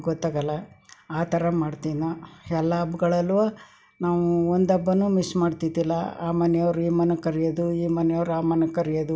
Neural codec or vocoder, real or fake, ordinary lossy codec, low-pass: none; real; none; none